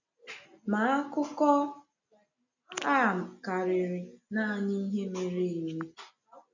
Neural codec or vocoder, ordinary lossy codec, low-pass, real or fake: none; none; 7.2 kHz; real